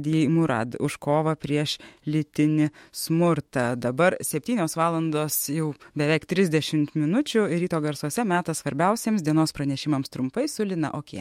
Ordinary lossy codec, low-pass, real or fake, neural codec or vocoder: MP3, 64 kbps; 19.8 kHz; fake; autoencoder, 48 kHz, 128 numbers a frame, DAC-VAE, trained on Japanese speech